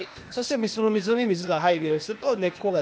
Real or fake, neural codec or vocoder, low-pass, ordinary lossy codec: fake; codec, 16 kHz, 0.8 kbps, ZipCodec; none; none